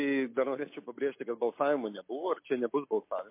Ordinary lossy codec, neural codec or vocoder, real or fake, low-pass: MP3, 24 kbps; none; real; 3.6 kHz